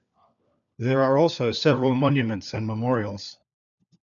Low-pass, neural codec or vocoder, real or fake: 7.2 kHz; codec, 16 kHz, 4 kbps, FunCodec, trained on LibriTTS, 50 frames a second; fake